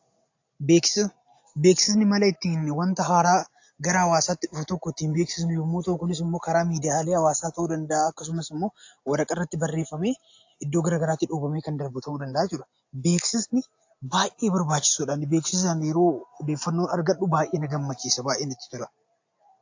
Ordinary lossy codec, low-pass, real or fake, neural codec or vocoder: AAC, 48 kbps; 7.2 kHz; real; none